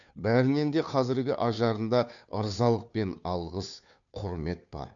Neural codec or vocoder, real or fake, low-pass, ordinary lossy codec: codec, 16 kHz, 2 kbps, FunCodec, trained on Chinese and English, 25 frames a second; fake; 7.2 kHz; none